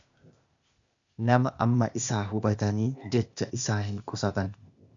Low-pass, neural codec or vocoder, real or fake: 7.2 kHz; codec, 16 kHz, 0.8 kbps, ZipCodec; fake